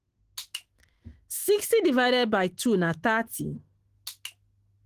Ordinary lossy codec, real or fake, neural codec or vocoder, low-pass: Opus, 16 kbps; fake; autoencoder, 48 kHz, 128 numbers a frame, DAC-VAE, trained on Japanese speech; 14.4 kHz